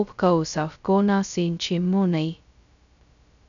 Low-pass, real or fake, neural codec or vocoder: 7.2 kHz; fake; codec, 16 kHz, 0.2 kbps, FocalCodec